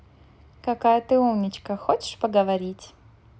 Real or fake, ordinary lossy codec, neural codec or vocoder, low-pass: real; none; none; none